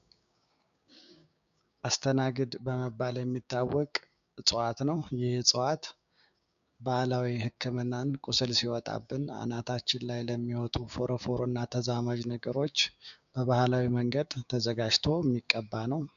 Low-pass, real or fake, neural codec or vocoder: 7.2 kHz; fake; codec, 16 kHz, 6 kbps, DAC